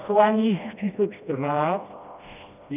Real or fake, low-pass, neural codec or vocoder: fake; 3.6 kHz; codec, 16 kHz, 1 kbps, FreqCodec, smaller model